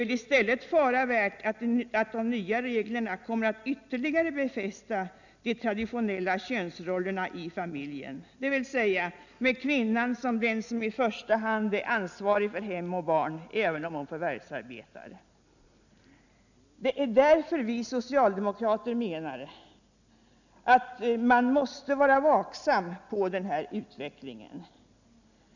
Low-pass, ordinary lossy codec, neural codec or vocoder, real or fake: 7.2 kHz; none; none; real